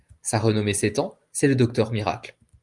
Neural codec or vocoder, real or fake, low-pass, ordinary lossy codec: none; real; 10.8 kHz; Opus, 32 kbps